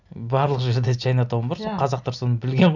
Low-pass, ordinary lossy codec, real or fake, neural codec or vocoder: 7.2 kHz; none; real; none